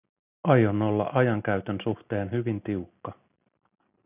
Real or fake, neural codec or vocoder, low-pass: real; none; 3.6 kHz